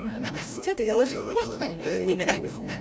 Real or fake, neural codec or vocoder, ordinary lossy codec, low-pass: fake; codec, 16 kHz, 0.5 kbps, FreqCodec, larger model; none; none